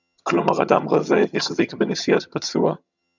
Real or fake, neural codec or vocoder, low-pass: fake; vocoder, 22.05 kHz, 80 mel bands, HiFi-GAN; 7.2 kHz